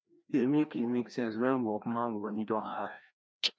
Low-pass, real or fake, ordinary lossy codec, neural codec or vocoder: none; fake; none; codec, 16 kHz, 1 kbps, FreqCodec, larger model